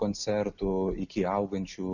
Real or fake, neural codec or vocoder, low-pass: real; none; 7.2 kHz